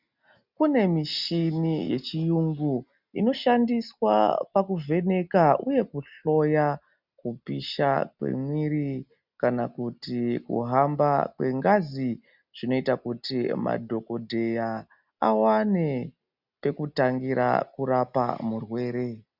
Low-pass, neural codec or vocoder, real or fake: 5.4 kHz; none; real